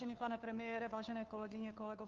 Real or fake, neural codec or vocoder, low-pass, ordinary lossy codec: fake; codec, 16 kHz, 2 kbps, FunCodec, trained on Chinese and English, 25 frames a second; 7.2 kHz; Opus, 32 kbps